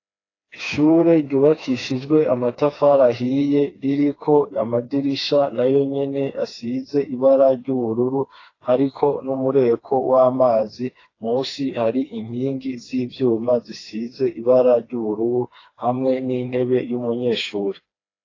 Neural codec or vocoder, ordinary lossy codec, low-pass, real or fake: codec, 16 kHz, 2 kbps, FreqCodec, smaller model; AAC, 32 kbps; 7.2 kHz; fake